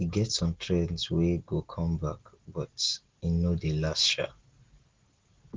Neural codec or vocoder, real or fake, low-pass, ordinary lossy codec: none; real; 7.2 kHz; Opus, 16 kbps